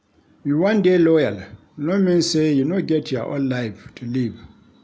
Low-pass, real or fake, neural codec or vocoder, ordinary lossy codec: none; real; none; none